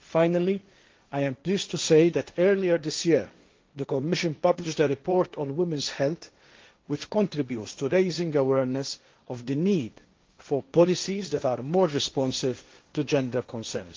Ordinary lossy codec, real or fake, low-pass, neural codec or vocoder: Opus, 32 kbps; fake; 7.2 kHz; codec, 16 kHz, 1.1 kbps, Voila-Tokenizer